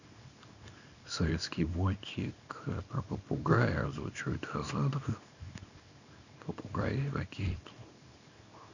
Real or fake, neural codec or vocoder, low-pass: fake; codec, 24 kHz, 0.9 kbps, WavTokenizer, small release; 7.2 kHz